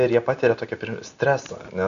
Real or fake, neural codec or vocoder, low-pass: real; none; 7.2 kHz